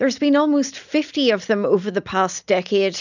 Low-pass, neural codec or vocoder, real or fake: 7.2 kHz; none; real